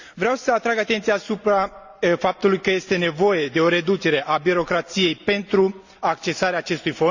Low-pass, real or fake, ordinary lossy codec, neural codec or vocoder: 7.2 kHz; real; Opus, 64 kbps; none